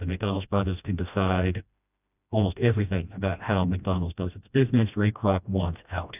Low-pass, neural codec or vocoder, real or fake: 3.6 kHz; codec, 16 kHz, 1 kbps, FreqCodec, smaller model; fake